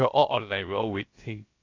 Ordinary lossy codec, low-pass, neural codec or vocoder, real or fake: AAC, 32 kbps; 7.2 kHz; codec, 16 kHz, about 1 kbps, DyCAST, with the encoder's durations; fake